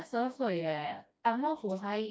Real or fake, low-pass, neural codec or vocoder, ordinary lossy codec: fake; none; codec, 16 kHz, 1 kbps, FreqCodec, smaller model; none